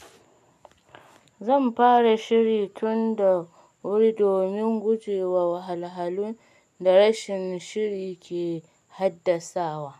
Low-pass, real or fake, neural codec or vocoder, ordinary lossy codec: 14.4 kHz; real; none; none